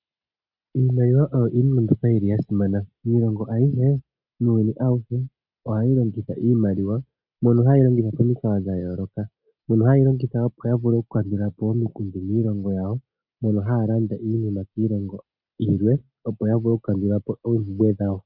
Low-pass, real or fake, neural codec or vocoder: 5.4 kHz; real; none